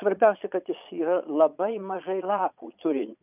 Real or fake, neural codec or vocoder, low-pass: fake; vocoder, 22.05 kHz, 80 mel bands, Vocos; 3.6 kHz